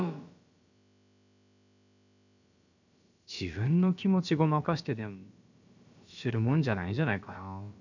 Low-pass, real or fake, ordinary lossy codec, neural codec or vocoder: 7.2 kHz; fake; none; codec, 16 kHz, about 1 kbps, DyCAST, with the encoder's durations